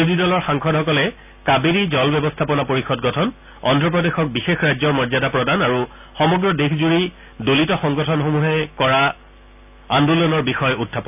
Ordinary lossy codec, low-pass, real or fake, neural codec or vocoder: none; 3.6 kHz; real; none